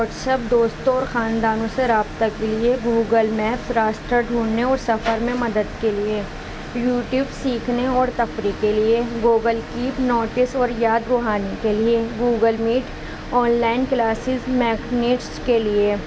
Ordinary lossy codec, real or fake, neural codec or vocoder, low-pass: none; real; none; none